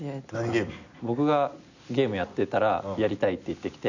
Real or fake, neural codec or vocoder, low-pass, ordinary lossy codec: real; none; 7.2 kHz; none